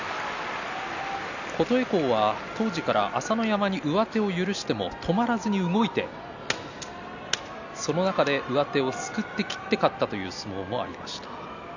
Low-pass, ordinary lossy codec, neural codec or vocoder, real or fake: 7.2 kHz; none; none; real